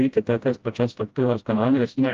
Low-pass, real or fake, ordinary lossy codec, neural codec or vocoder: 7.2 kHz; fake; Opus, 32 kbps; codec, 16 kHz, 0.5 kbps, FreqCodec, smaller model